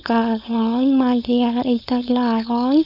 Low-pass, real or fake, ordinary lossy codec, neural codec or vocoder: 5.4 kHz; fake; none; codec, 16 kHz, 4.8 kbps, FACodec